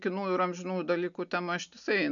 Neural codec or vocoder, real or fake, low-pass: none; real; 7.2 kHz